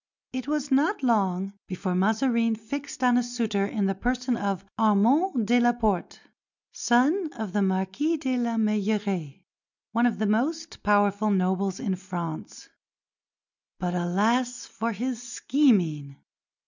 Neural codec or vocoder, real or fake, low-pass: none; real; 7.2 kHz